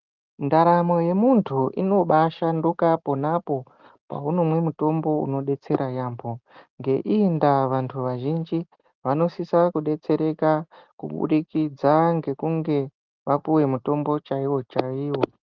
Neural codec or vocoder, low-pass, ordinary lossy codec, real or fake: none; 7.2 kHz; Opus, 24 kbps; real